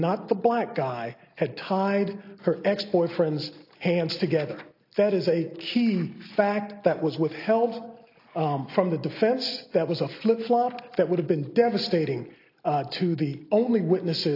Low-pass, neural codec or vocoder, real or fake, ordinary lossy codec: 5.4 kHz; none; real; AAC, 32 kbps